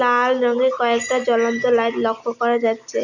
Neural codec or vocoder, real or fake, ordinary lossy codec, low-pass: none; real; none; 7.2 kHz